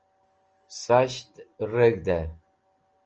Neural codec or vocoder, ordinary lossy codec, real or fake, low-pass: none; Opus, 24 kbps; real; 7.2 kHz